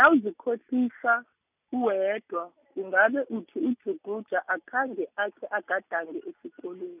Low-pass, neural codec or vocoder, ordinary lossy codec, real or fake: 3.6 kHz; none; none; real